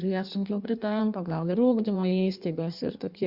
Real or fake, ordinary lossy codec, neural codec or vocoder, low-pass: fake; Opus, 64 kbps; codec, 16 kHz in and 24 kHz out, 1.1 kbps, FireRedTTS-2 codec; 5.4 kHz